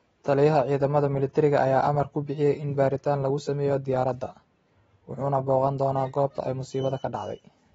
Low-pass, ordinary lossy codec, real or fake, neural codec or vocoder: 19.8 kHz; AAC, 24 kbps; real; none